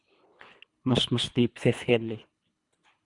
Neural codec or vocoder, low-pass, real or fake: codec, 24 kHz, 3 kbps, HILCodec; 10.8 kHz; fake